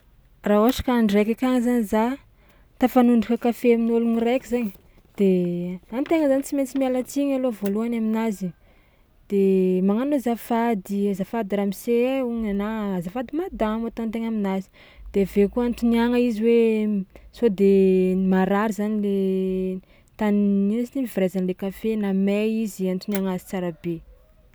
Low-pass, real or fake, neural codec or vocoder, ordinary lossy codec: none; real; none; none